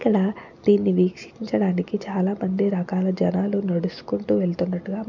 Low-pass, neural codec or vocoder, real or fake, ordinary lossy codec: 7.2 kHz; none; real; none